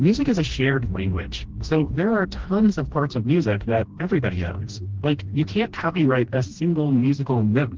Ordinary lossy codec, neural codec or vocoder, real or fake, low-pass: Opus, 16 kbps; codec, 16 kHz, 1 kbps, FreqCodec, smaller model; fake; 7.2 kHz